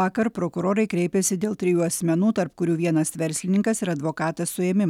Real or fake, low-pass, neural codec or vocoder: real; 19.8 kHz; none